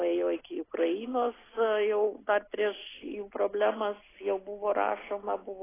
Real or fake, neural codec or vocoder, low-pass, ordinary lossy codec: real; none; 3.6 kHz; AAC, 16 kbps